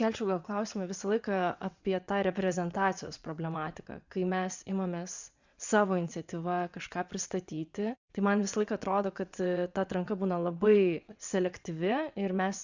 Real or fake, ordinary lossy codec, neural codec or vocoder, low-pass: fake; Opus, 64 kbps; vocoder, 22.05 kHz, 80 mel bands, WaveNeXt; 7.2 kHz